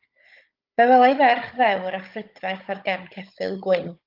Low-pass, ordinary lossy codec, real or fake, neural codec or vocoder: 5.4 kHz; Opus, 24 kbps; fake; codec, 16 kHz, 16 kbps, FunCodec, trained on Chinese and English, 50 frames a second